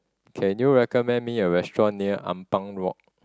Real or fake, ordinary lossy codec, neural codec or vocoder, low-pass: real; none; none; none